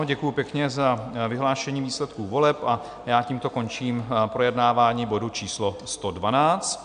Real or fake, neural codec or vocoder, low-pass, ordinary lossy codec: real; none; 9.9 kHz; AAC, 96 kbps